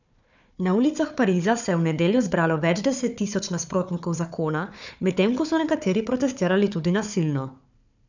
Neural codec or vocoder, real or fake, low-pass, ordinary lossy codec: codec, 16 kHz, 4 kbps, FunCodec, trained on Chinese and English, 50 frames a second; fake; 7.2 kHz; none